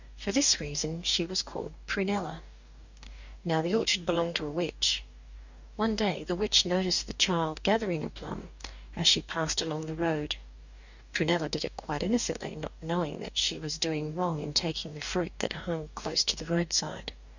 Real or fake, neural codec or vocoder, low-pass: fake; codec, 44.1 kHz, 2.6 kbps, DAC; 7.2 kHz